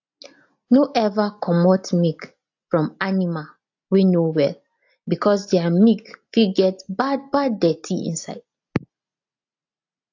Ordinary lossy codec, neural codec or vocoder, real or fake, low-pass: AAC, 48 kbps; none; real; 7.2 kHz